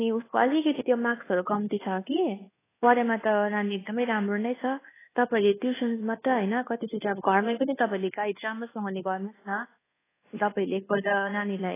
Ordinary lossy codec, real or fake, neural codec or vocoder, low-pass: AAC, 16 kbps; fake; codec, 24 kHz, 1.2 kbps, DualCodec; 3.6 kHz